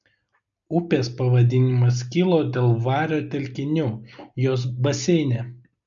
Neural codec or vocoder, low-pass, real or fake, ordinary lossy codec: none; 7.2 kHz; real; MP3, 96 kbps